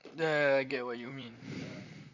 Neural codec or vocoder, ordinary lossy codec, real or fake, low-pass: vocoder, 44.1 kHz, 128 mel bands, Pupu-Vocoder; none; fake; 7.2 kHz